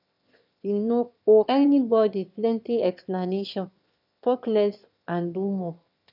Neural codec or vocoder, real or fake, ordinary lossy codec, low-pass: autoencoder, 22.05 kHz, a latent of 192 numbers a frame, VITS, trained on one speaker; fake; none; 5.4 kHz